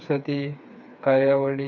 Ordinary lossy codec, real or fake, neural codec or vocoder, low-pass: none; fake; codec, 16 kHz, 4 kbps, FreqCodec, smaller model; 7.2 kHz